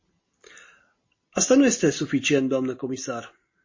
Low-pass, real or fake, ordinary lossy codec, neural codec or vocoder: 7.2 kHz; real; MP3, 32 kbps; none